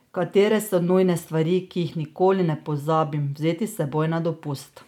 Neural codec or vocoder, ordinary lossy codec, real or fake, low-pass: none; none; real; 19.8 kHz